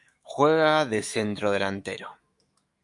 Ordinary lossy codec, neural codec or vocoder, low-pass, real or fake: Opus, 64 kbps; autoencoder, 48 kHz, 128 numbers a frame, DAC-VAE, trained on Japanese speech; 10.8 kHz; fake